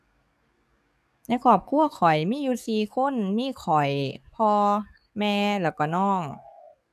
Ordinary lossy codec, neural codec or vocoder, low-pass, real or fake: none; codec, 44.1 kHz, 7.8 kbps, DAC; 14.4 kHz; fake